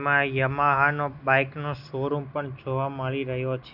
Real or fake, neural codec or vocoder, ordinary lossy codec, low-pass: real; none; Opus, 64 kbps; 5.4 kHz